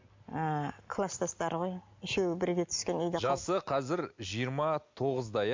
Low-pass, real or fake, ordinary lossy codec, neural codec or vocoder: 7.2 kHz; real; MP3, 48 kbps; none